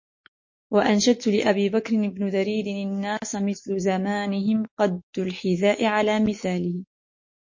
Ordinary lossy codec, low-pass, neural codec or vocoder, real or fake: MP3, 32 kbps; 7.2 kHz; autoencoder, 48 kHz, 128 numbers a frame, DAC-VAE, trained on Japanese speech; fake